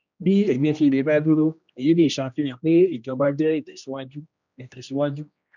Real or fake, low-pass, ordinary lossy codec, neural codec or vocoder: fake; 7.2 kHz; none; codec, 16 kHz, 1 kbps, X-Codec, HuBERT features, trained on general audio